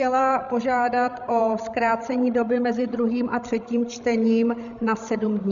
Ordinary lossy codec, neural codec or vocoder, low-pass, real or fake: MP3, 96 kbps; codec, 16 kHz, 16 kbps, FreqCodec, larger model; 7.2 kHz; fake